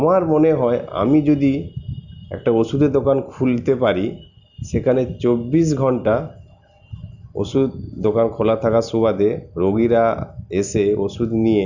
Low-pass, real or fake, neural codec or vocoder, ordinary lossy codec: 7.2 kHz; real; none; none